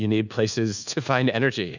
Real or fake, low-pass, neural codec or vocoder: fake; 7.2 kHz; codec, 24 kHz, 1.2 kbps, DualCodec